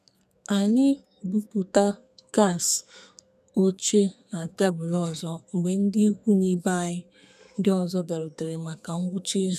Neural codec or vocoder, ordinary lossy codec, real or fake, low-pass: codec, 32 kHz, 1.9 kbps, SNAC; none; fake; 14.4 kHz